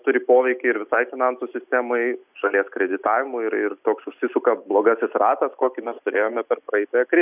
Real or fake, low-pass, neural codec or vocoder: real; 3.6 kHz; none